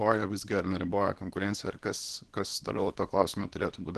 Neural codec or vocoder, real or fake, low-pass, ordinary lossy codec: codec, 24 kHz, 0.9 kbps, WavTokenizer, small release; fake; 10.8 kHz; Opus, 16 kbps